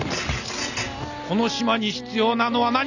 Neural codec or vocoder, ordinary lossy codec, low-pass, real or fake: none; none; 7.2 kHz; real